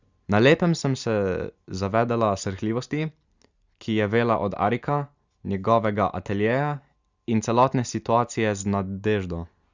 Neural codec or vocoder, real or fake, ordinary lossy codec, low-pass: none; real; Opus, 64 kbps; 7.2 kHz